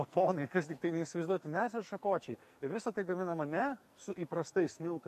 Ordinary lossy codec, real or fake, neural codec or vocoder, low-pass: AAC, 64 kbps; fake; codec, 44.1 kHz, 2.6 kbps, SNAC; 14.4 kHz